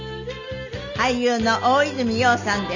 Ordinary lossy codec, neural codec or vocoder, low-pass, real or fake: none; none; 7.2 kHz; real